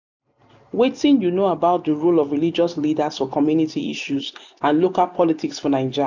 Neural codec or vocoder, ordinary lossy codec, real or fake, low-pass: none; none; real; 7.2 kHz